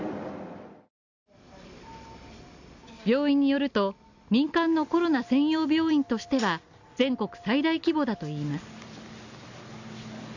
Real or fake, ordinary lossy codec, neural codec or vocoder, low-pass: real; none; none; 7.2 kHz